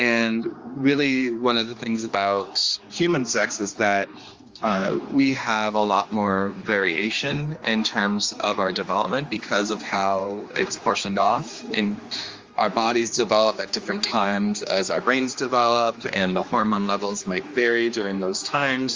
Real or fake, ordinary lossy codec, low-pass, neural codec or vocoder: fake; Opus, 32 kbps; 7.2 kHz; codec, 16 kHz, 2 kbps, X-Codec, HuBERT features, trained on general audio